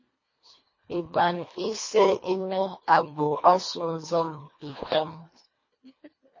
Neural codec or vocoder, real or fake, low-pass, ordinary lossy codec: codec, 24 kHz, 1.5 kbps, HILCodec; fake; 7.2 kHz; MP3, 32 kbps